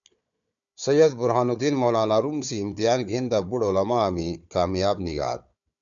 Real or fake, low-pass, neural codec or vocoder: fake; 7.2 kHz; codec, 16 kHz, 4 kbps, FunCodec, trained on Chinese and English, 50 frames a second